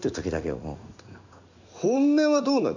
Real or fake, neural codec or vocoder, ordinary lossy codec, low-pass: fake; codec, 16 kHz in and 24 kHz out, 1 kbps, XY-Tokenizer; none; 7.2 kHz